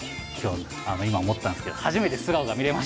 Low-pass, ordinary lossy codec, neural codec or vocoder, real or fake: none; none; none; real